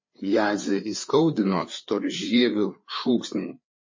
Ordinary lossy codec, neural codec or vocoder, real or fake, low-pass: MP3, 32 kbps; codec, 16 kHz, 4 kbps, FreqCodec, larger model; fake; 7.2 kHz